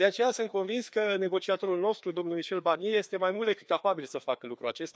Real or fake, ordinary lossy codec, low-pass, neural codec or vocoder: fake; none; none; codec, 16 kHz, 2 kbps, FreqCodec, larger model